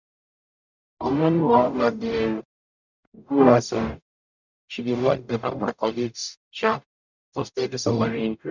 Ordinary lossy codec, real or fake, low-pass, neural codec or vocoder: none; fake; 7.2 kHz; codec, 44.1 kHz, 0.9 kbps, DAC